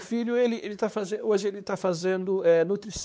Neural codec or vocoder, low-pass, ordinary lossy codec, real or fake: codec, 16 kHz, 4 kbps, X-Codec, WavLM features, trained on Multilingual LibriSpeech; none; none; fake